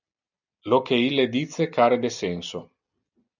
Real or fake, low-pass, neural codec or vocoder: real; 7.2 kHz; none